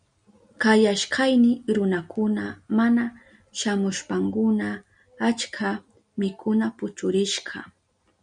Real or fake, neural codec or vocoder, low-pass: real; none; 9.9 kHz